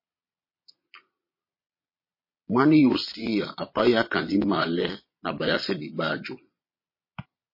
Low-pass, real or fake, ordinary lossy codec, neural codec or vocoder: 5.4 kHz; fake; MP3, 24 kbps; vocoder, 22.05 kHz, 80 mel bands, Vocos